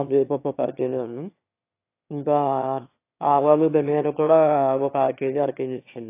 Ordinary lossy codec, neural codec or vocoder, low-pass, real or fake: AAC, 24 kbps; autoencoder, 22.05 kHz, a latent of 192 numbers a frame, VITS, trained on one speaker; 3.6 kHz; fake